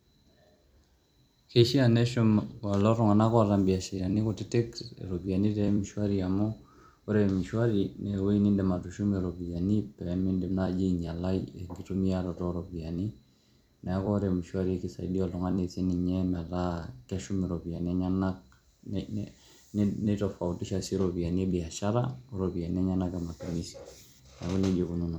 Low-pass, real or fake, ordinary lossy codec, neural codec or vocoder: 19.8 kHz; fake; MP3, 96 kbps; vocoder, 44.1 kHz, 128 mel bands every 256 samples, BigVGAN v2